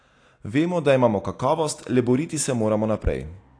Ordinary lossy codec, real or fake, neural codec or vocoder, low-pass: AAC, 48 kbps; real; none; 9.9 kHz